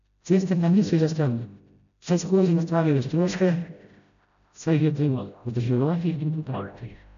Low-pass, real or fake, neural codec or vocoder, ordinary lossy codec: 7.2 kHz; fake; codec, 16 kHz, 0.5 kbps, FreqCodec, smaller model; none